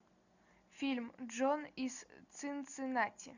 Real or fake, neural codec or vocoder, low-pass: real; none; 7.2 kHz